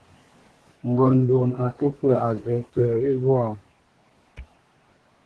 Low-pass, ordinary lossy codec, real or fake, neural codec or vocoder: 10.8 kHz; Opus, 16 kbps; fake; codec, 24 kHz, 1 kbps, SNAC